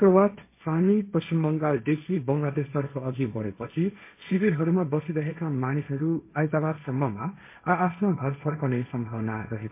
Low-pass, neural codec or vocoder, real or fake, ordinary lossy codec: 3.6 kHz; codec, 16 kHz, 1.1 kbps, Voila-Tokenizer; fake; MP3, 24 kbps